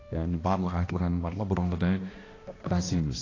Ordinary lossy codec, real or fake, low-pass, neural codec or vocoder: AAC, 32 kbps; fake; 7.2 kHz; codec, 16 kHz, 1 kbps, X-Codec, HuBERT features, trained on balanced general audio